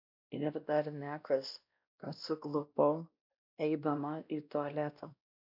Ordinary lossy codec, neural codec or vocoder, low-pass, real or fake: AAC, 48 kbps; codec, 16 kHz, 1 kbps, X-Codec, WavLM features, trained on Multilingual LibriSpeech; 5.4 kHz; fake